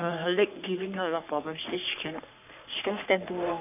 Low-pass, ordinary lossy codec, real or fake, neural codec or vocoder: 3.6 kHz; none; fake; codec, 44.1 kHz, 3.4 kbps, Pupu-Codec